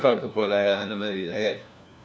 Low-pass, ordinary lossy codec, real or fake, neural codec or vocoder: none; none; fake; codec, 16 kHz, 1 kbps, FunCodec, trained on LibriTTS, 50 frames a second